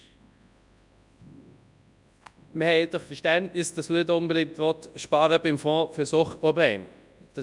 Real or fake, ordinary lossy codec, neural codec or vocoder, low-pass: fake; none; codec, 24 kHz, 0.9 kbps, WavTokenizer, large speech release; 10.8 kHz